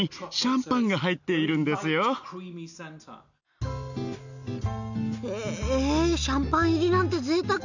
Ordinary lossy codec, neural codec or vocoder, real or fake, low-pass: none; none; real; 7.2 kHz